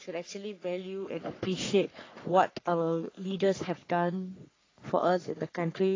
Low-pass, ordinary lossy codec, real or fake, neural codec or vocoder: 7.2 kHz; AAC, 32 kbps; fake; codec, 44.1 kHz, 3.4 kbps, Pupu-Codec